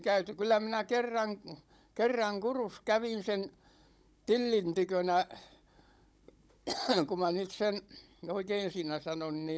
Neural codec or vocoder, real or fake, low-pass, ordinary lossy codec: codec, 16 kHz, 16 kbps, FunCodec, trained on Chinese and English, 50 frames a second; fake; none; none